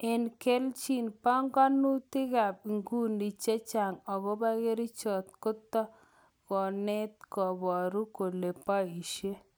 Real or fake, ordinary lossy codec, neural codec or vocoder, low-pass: real; none; none; none